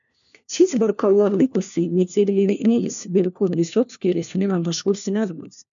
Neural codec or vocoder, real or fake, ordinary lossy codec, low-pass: codec, 16 kHz, 1 kbps, FunCodec, trained on LibriTTS, 50 frames a second; fake; MP3, 96 kbps; 7.2 kHz